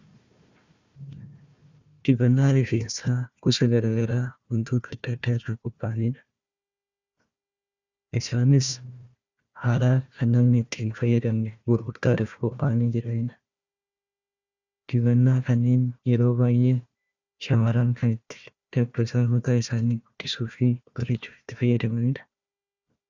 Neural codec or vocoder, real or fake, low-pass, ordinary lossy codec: codec, 16 kHz, 1 kbps, FunCodec, trained on Chinese and English, 50 frames a second; fake; 7.2 kHz; Opus, 64 kbps